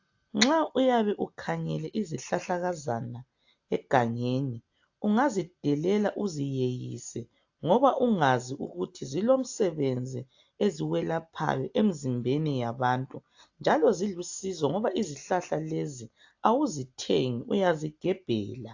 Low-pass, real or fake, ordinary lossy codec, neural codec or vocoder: 7.2 kHz; real; AAC, 48 kbps; none